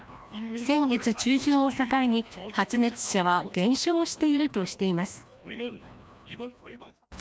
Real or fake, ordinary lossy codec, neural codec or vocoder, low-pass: fake; none; codec, 16 kHz, 1 kbps, FreqCodec, larger model; none